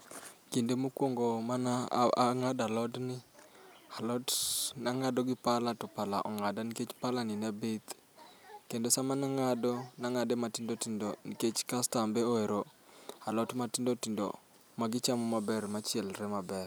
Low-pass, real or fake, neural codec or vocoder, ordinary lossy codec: none; real; none; none